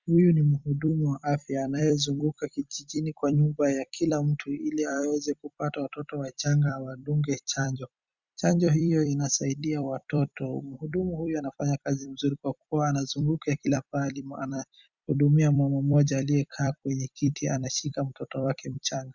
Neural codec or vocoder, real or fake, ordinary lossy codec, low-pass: vocoder, 44.1 kHz, 128 mel bands every 256 samples, BigVGAN v2; fake; Opus, 64 kbps; 7.2 kHz